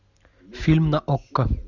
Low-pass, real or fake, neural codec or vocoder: 7.2 kHz; real; none